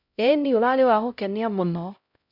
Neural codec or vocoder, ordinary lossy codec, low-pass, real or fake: codec, 16 kHz, 0.5 kbps, X-Codec, HuBERT features, trained on LibriSpeech; none; 5.4 kHz; fake